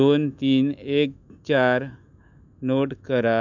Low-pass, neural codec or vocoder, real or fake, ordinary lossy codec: 7.2 kHz; autoencoder, 48 kHz, 128 numbers a frame, DAC-VAE, trained on Japanese speech; fake; none